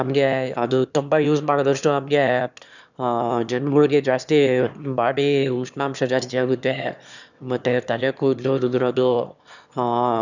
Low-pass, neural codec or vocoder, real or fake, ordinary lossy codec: 7.2 kHz; autoencoder, 22.05 kHz, a latent of 192 numbers a frame, VITS, trained on one speaker; fake; none